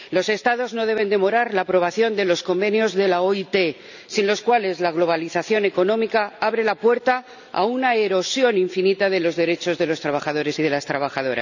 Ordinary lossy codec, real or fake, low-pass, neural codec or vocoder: none; real; 7.2 kHz; none